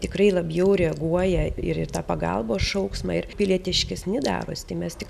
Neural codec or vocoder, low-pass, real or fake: none; 14.4 kHz; real